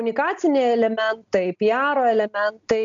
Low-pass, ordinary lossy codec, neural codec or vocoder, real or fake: 7.2 kHz; AAC, 64 kbps; none; real